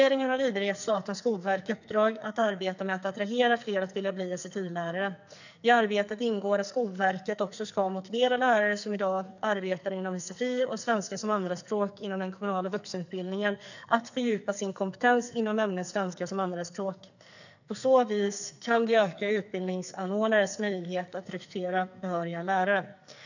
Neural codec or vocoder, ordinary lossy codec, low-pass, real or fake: codec, 44.1 kHz, 2.6 kbps, SNAC; none; 7.2 kHz; fake